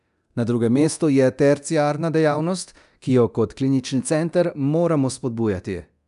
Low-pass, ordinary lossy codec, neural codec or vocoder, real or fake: 10.8 kHz; none; codec, 24 kHz, 0.9 kbps, DualCodec; fake